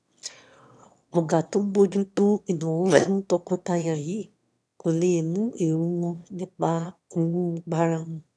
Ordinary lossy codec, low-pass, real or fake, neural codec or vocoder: none; none; fake; autoencoder, 22.05 kHz, a latent of 192 numbers a frame, VITS, trained on one speaker